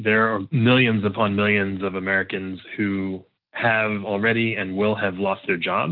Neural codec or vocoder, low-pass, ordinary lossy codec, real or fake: none; 5.4 kHz; Opus, 24 kbps; real